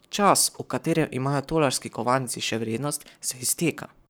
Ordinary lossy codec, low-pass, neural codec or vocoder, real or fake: none; none; codec, 44.1 kHz, 7.8 kbps, Pupu-Codec; fake